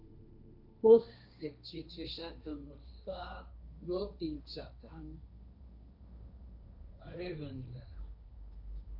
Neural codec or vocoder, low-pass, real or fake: codec, 16 kHz, 1.1 kbps, Voila-Tokenizer; 5.4 kHz; fake